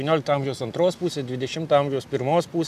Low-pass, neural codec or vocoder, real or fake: 14.4 kHz; none; real